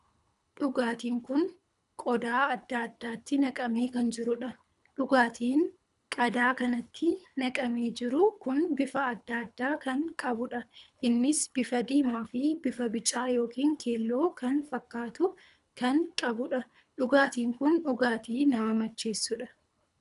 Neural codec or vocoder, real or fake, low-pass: codec, 24 kHz, 3 kbps, HILCodec; fake; 10.8 kHz